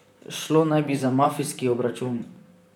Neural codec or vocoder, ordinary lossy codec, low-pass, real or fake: vocoder, 44.1 kHz, 128 mel bands, Pupu-Vocoder; none; 19.8 kHz; fake